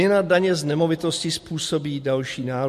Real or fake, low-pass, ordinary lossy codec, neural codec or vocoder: real; 14.4 kHz; MP3, 64 kbps; none